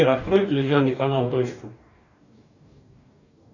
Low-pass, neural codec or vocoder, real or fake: 7.2 kHz; codec, 44.1 kHz, 2.6 kbps, DAC; fake